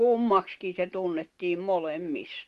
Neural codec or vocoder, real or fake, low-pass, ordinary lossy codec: none; real; 14.4 kHz; Opus, 16 kbps